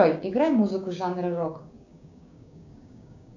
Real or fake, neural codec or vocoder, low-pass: fake; codec, 16 kHz, 6 kbps, DAC; 7.2 kHz